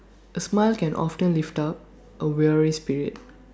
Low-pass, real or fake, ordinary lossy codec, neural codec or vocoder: none; real; none; none